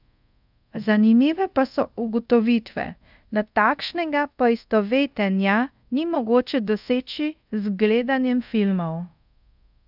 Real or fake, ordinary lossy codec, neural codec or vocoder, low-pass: fake; none; codec, 24 kHz, 0.5 kbps, DualCodec; 5.4 kHz